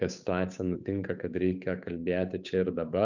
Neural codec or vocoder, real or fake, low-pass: codec, 16 kHz, 8 kbps, FunCodec, trained on Chinese and English, 25 frames a second; fake; 7.2 kHz